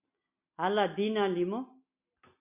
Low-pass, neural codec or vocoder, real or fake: 3.6 kHz; none; real